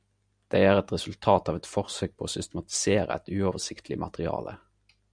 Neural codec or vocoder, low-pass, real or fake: none; 9.9 kHz; real